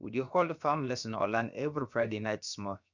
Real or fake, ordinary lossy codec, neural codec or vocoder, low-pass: fake; none; codec, 16 kHz, about 1 kbps, DyCAST, with the encoder's durations; 7.2 kHz